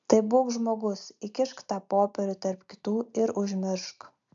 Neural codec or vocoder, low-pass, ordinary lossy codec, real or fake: none; 7.2 kHz; AAC, 64 kbps; real